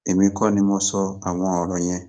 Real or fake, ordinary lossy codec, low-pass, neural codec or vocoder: fake; none; 9.9 kHz; codec, 44.1 kHz, 7.8 kbps, DAC